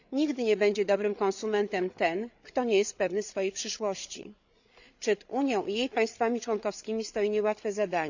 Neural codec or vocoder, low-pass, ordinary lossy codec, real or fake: codec, 16 kHz, 8 kbps, FreqCodec, larger model; 7.2 kHz; none; fake